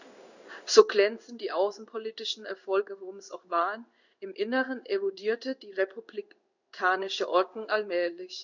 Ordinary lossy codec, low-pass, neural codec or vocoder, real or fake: none; 7.2 kHz; codec, 16 kHz in and 24 kHz out, 1 kbps, XY-Tokenizer; fake